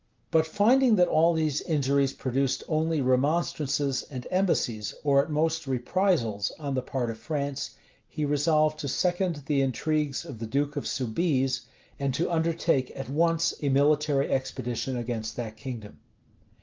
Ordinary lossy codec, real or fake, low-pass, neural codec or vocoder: Opus, 32 kbps; real; 7.2 kHz; none